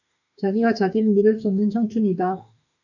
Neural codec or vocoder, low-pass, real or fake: autoencoder, 48 kHz, 32 numbers a frame, DAC-VAE, trained on Japanese speech; 7.2 kHz; fake